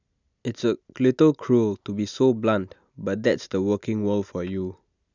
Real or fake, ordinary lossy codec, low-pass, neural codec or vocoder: real; none; 7.2 kHz; none